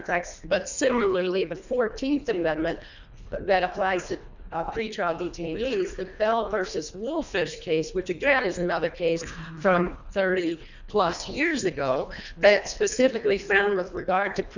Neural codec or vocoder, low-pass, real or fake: codec, 24 kHz, 1.5 kbps, HILCodec; 7.2 kHz; fake